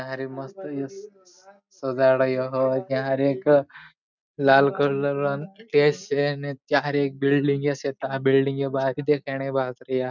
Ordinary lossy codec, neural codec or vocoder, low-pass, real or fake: none; none; 7.2 kHz; real